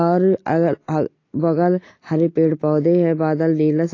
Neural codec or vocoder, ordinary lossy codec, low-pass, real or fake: none; AAC, 32 kbps; 7.2 kHz; real